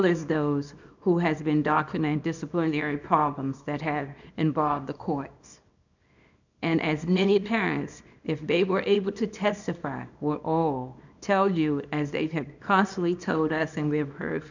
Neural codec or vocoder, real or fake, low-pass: codec, 24 kHz, 0.9 kbps, WavTokenizer, small release; fake; 7.2 kHz